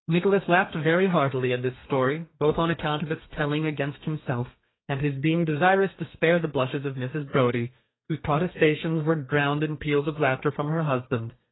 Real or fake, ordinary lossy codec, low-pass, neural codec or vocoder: fake; AAC, 16 kbps; 7.2 kHz; codec, 32 kHz, 1.9 kbps, SNAC